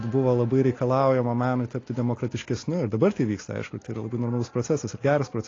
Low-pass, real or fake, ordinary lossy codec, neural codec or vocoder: 7.2 kHz; real; AAC, 32 kbps; none